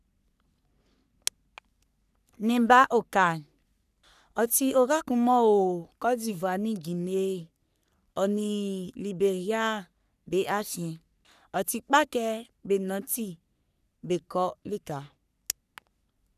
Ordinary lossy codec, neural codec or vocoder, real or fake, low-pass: none; codec, 44.1 kHz, 3.4 kbps, Pupu-Codec; fake; 14.4 kHz